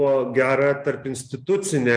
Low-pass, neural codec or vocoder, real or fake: 9.9 kHz; none; real